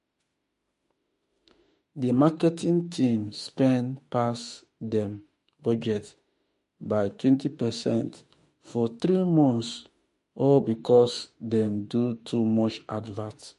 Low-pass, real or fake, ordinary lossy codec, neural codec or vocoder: 14.4 kHz; fake; MP3, 48 kbps; autoencoder, 48 kHz, 32 numbers a frame, DAC-VAE, trained on Japanese speech